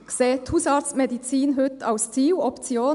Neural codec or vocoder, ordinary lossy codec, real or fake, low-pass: none; none; real; 10.8 kHz